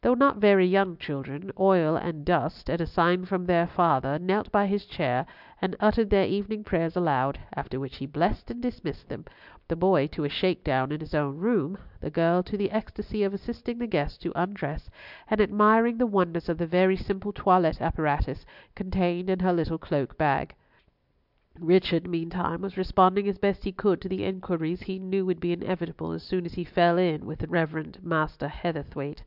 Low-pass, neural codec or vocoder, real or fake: 5.4 kHz; none; real